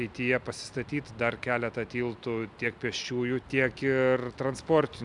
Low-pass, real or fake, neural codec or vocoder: 10.8 kHz; real; none